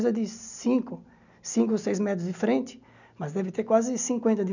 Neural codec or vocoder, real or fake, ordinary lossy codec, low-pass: none; real; none; 7.2 kHz